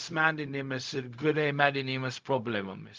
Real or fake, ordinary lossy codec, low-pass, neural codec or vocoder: fake; Opus, 24 kbps; 7.2 kHz; codec, 16 kHz, 0.4 kbps, LongCat-Audio-Codec